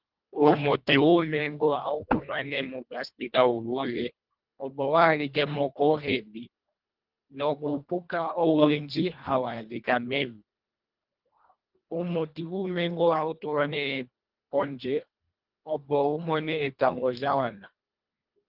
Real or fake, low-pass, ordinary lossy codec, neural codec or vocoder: fake; 5.4 kHz; Opus, 24 kbps; codec, 24 kHz, 1.5 kbps, HILCodec